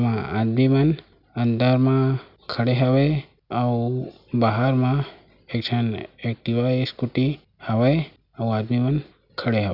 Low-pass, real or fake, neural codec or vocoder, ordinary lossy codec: 5.4 kHz; real; none; none